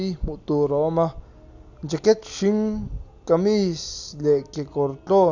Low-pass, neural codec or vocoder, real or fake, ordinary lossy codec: 7.2 kHz; none; real; none